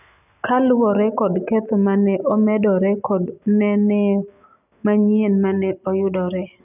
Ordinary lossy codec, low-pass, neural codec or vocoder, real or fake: none; 3.6 kHz; none; real